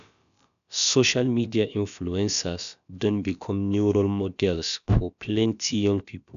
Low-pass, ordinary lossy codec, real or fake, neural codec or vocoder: 7.2 kHz; none; fake; codec, 16 kHz, about 1 kbps, DyCAST, with the encoder's durations